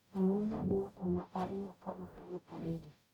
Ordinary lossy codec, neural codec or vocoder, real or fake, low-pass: none; codec, 44.1 kHz, 0.9 kbps, DAC; fake; 19.8 kHz